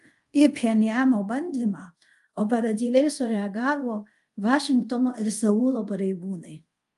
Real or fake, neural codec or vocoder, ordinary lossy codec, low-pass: fake; codec, 24 kHz, 0.5 kbps, DualCodec; Opus, 32 kbps; 10.8 kHz